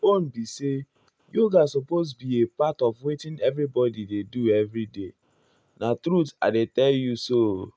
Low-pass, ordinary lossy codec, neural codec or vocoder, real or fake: none; none; none; real